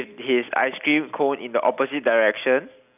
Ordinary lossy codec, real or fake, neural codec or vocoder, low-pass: none; real; none; 3.6 kHz